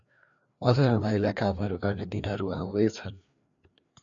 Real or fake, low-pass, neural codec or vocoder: fake; 7.2 kHz; codec, 16 kHz, 2 kbps, FreqCodec, larger model